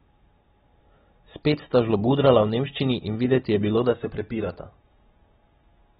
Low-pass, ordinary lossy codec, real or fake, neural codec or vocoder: 19.8 kHz; AAC, 16 kbps; real; none